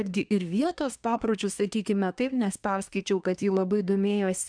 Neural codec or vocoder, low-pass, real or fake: codec, 24 kHz, 1 kbps, SNAC; 9.9 kHz; fake